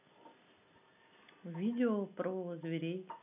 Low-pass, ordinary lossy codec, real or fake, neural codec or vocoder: 3.6 kHz; none; real; none